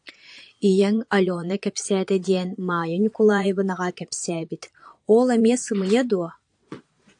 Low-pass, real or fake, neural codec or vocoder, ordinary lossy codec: 9.9 kHz; fake; vocoder, 22.05 kHz, 80 mel bands, Vocos; AAC, 64 kbps